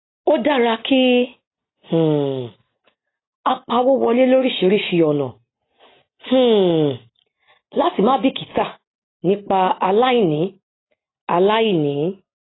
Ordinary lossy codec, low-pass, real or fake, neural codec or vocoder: AAC, 16 kbps; 7.2 kHz; real; none